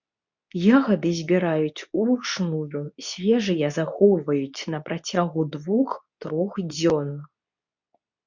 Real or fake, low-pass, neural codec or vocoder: fake; 7.2 kHz; codec, 24 kHz, 0.9 kbps, WavTokenizer, medium speech release version 2